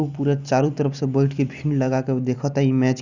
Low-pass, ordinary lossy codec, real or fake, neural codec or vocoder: 7.2 kHz; none; real; none